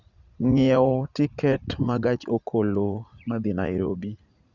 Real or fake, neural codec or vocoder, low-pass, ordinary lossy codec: fake; vocoder, 44.1 kHz, 80 mel bands, Vocos; 7.2 kHz; Opus, 64 kbps